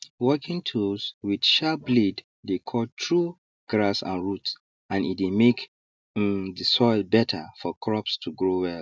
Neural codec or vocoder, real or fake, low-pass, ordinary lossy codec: none; real; none; none